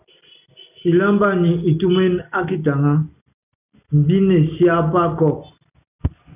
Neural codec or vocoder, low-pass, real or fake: none; 3.6 kHz; real